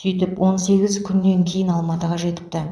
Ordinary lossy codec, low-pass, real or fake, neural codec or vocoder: none; none; fake; vocoder, 22.05 kHz, 80 mel bands, Vocos